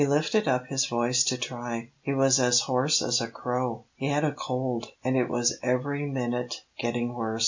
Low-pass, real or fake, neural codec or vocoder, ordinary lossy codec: 7.2 kHz; real; none; MP3, 64 kbps